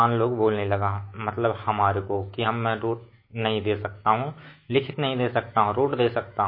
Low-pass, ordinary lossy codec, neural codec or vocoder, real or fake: 5.4 kHz; MP3, 24 kbps; autoencoder, 48 kHz, 128 numbers a frame, DAC-VAE, trained on Japanese speech; fake